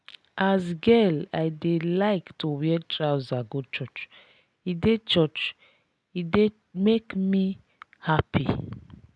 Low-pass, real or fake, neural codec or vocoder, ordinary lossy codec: none; real; none; none